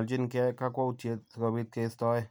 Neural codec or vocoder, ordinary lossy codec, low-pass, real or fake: none; none; none; real